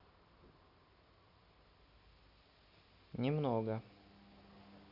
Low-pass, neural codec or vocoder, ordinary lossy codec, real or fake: 5.4 kHz; none; none; real